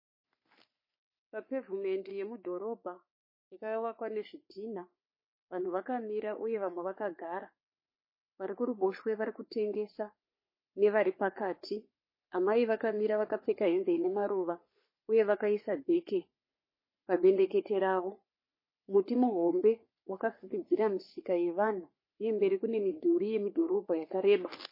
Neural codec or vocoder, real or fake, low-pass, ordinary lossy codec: codec, 44.1 kHz, 3.4 kbps, Pupu-Codec; fake; 5.4 kHz; MP3, 24 kbps